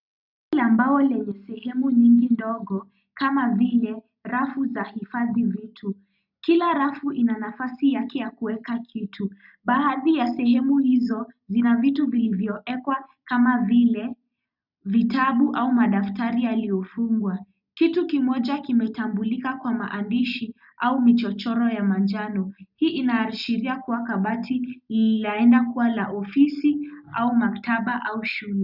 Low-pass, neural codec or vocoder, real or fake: 5.4 kHz; none; real